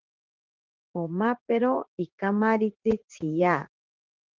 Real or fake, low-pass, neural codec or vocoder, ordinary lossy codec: fake; 7.2 kHz; vocoder, 44.1 kHz, 80 mel bands, Vocos; Opus, 16 kbps